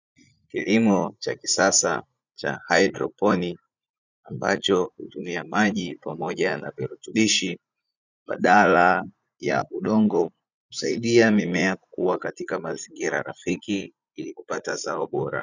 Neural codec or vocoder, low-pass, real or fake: vocoder, 44.1 kHz, 80 mel bands, Vocos; 7.2 kHz; fake